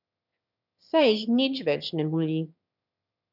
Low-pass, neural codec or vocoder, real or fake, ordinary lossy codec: 5.4 kHz; autoencoder, 22.05 kHz, a latent of 192 numbers a frame, VITS, trained on one speaker; fake; none